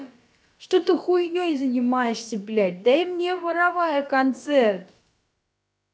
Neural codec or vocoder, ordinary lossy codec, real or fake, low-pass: codec, 16 kHz, about 1 kbps, DyCAST, with the encoder's durations; none; fake; none